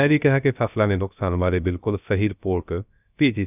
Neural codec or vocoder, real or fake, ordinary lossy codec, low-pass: codec, 16 kHz, 0.3 kbps, FocalCodec; fake; none; 3.6 kHz